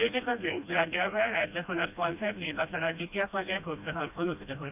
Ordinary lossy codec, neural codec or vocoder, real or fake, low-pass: none; codec, 16 kHz, 1 kbps, FreqCodec, smaller model; fake; 3.6 kHz